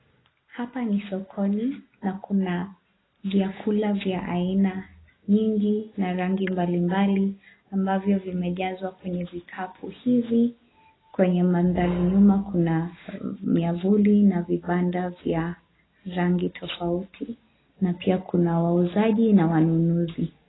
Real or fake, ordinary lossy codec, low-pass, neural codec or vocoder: real; AAC, 16 kbps; 7.2 kHz; none